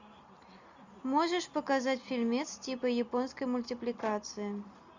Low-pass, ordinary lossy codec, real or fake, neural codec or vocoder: 7.2 kHz; Opus, 64 kbps; real; none